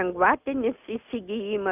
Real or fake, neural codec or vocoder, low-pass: real; none; 3.6 kHz